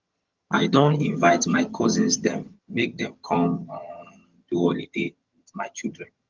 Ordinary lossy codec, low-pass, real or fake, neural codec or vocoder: Opus, 32 kbps; 7.2 kHz; fake; vocoder, 22.05 kHz, 80 mel bands, HiFi-GAN